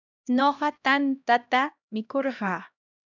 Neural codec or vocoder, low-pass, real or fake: codec, 16 kHz, 1 kbps, X-Codec, HuBERT features, trained on LibriSpeech; 7.2 kHz; fake